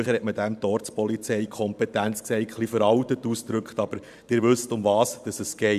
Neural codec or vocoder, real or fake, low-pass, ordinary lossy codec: vocoder, 44.1 kHz, 128 mel bands every 512 samples, BigVGAN v2; fake; 14.4 kHz; none